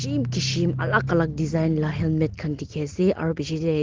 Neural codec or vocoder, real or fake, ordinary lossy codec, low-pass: none; real; Opus, 16 kbps; 7.2 kHz